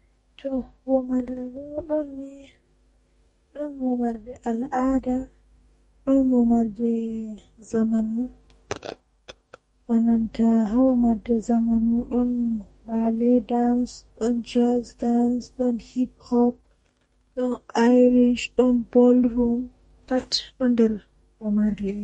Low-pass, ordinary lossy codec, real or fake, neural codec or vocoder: 19.8 kHz; MP3, 48 kbps; fake; codec, 44.1 kHz, 2.6 kbps, DAC